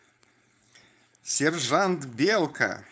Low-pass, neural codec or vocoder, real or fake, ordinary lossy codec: none; codec, 16 kHz, 4.8 kbps, FACodec; fake; none